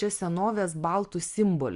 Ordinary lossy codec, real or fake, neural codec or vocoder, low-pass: Opus, 64 kbps; real; none; 10.8 kHz